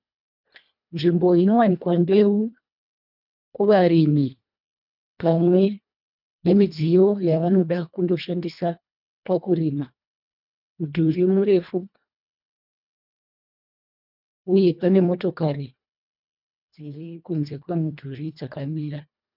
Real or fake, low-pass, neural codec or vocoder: fake; 5.4 kHz; codec, 24 kHz, 1.5 kbps, HILCodec